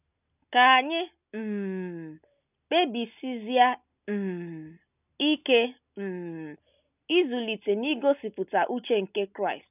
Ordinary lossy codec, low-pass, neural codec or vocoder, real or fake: none; 3.6 kHz; none; real